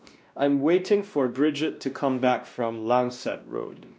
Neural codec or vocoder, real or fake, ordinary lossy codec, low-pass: codec, 16 kHz, 1 kbps, X-Codec, WavLM features, trained on Multilingual LibriSpeech; fake; none; none